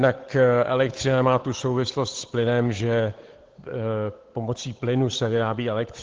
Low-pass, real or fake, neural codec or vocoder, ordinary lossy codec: 7.2 kHz; fake; codec, 16 kHz, 8 kbps, FunCodec, trained on Chinese and English, 25 frames a second; Opus, 16 kbps